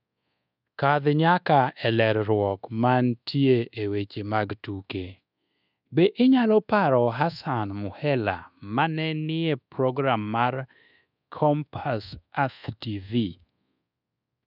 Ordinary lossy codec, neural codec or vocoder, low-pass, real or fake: none; codec, 24 kHz, 1.2 kbps, DualCodec; 5.4 kHz; fake